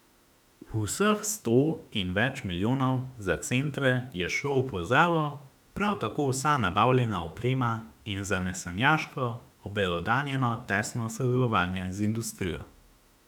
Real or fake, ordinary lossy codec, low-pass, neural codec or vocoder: fake; none; 19.8 kHz; autoencoder, 48 kHz, 32 numbers a frame, DAC-VAE, trained on Japanese speech